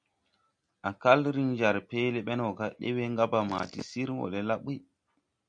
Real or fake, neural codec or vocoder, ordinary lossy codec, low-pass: real; none; MP3, 96 kbps; 9.9 kHz